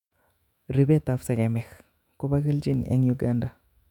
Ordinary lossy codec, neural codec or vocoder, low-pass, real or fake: none; autoencoder, 48 kHz, 128 numbers a frame, DAC-VAE, trained on Japanese speech; 19.8 kHz; fake